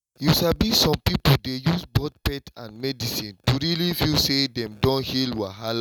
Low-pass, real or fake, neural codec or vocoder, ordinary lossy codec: 19.8 kHz; real; none; none